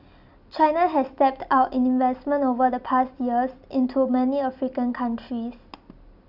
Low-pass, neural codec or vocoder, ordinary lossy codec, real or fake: 5.4 kHz; none; Opus, 64 kbps; real